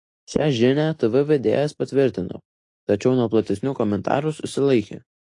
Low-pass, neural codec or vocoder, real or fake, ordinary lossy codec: 10.8 kHz; none; real; AAC, 48 kbps